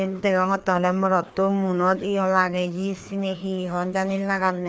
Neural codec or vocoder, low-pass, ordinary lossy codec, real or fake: codec, 16 kHz, 2 kbps, FreqCodec, larger model; none; none; fake